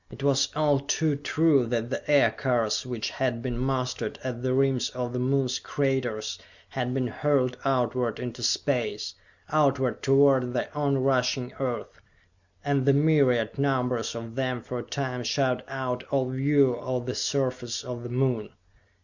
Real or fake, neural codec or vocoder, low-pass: real; none; 7.2 kHz